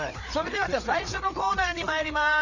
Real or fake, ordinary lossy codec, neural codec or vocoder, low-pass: fake; none; codec, 16 kHz, 4 kbps, FreqCodec, larger model; 7.2 kHz